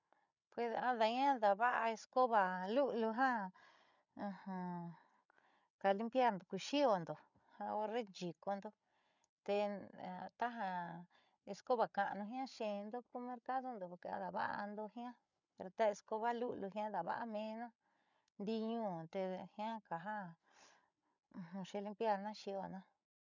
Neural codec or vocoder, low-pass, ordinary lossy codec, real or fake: codec, 16 kHz, 8 kbps, FreqCodec, larger model; 7.2 kHz; none; fake